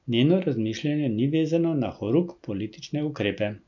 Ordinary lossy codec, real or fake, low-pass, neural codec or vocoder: none; real; 7.2 kHz; none